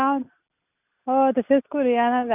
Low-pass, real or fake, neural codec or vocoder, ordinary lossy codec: 3.6 kHz; real; none; none